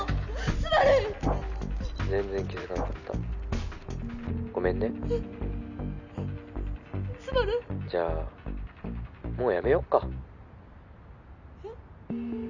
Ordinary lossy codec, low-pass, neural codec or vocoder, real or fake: none; 7.2 kHz; none; real